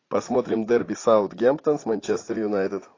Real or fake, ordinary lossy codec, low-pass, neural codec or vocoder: fake; AAC, 32 kbps; 7.2 kHz; vocoder, 44.1 kHz, 80 mel bands, Vocos